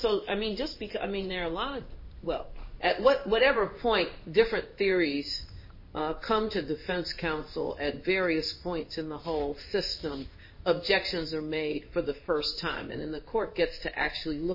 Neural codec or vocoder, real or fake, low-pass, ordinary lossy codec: codec, 16 kHz in and 24 kHz out, 1 kbps, XY-Tokenizer; fake; 5.4 kHz; MP3, 24 kbps